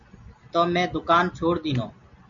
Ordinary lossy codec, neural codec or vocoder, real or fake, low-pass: MP3, 48 kbps; none; real; 7.2 kHz